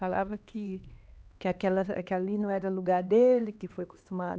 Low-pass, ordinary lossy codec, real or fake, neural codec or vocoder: none; none; fake; codec, 16 kHz, 2 kbps, X-Codec, HuBERT features, trained on LibriSpeech